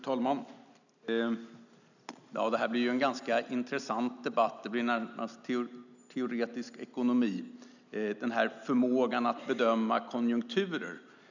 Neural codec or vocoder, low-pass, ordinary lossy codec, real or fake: none; 7.2 kHz; none; real